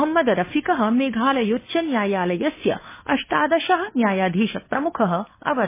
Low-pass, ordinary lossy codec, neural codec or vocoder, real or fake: 3.6 kHz; MP3, 16 kbps; codec, 16 kHz, 2 kbps, FunCodec, trained on Chinese and English, 25 frames a second; fake